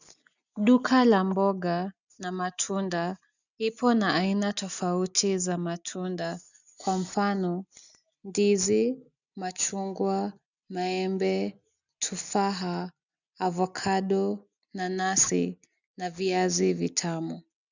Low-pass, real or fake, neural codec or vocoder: 7.2 kHz; real; none